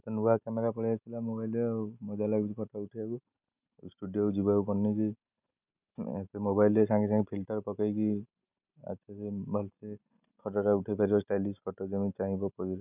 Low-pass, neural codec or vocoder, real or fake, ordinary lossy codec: 3.6 kHz; none; real; none